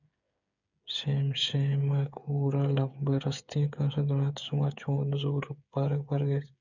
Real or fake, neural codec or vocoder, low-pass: fake; codec, 16 kHz, 8 kbps, FreqCodec, smaller model; 7.2 kHz